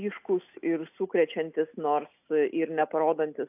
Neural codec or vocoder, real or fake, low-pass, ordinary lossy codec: none; real; 3.6 kHz; AAC, 32 kbps